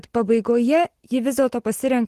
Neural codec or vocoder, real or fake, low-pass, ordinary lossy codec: none; real; 14.4 kHz; Opus, 16 kbps